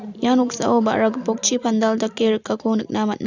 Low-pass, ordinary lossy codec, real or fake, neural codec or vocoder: 7.2 kHz; none; real; none